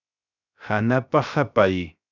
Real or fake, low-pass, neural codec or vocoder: fake; 7.2 kHz; codec, 16 kHz, 0.3 kbps, FocalCodec